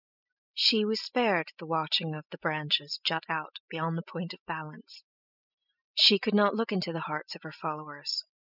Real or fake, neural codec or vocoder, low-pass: real; none; 5.4 kHz